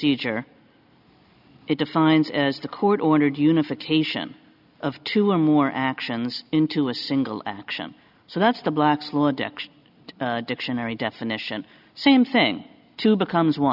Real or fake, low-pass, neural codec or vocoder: real; 5.4 kHz; none